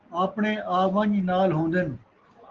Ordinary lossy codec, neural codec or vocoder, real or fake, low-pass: Opus, 16 kbps; none; real; 7.2 kHz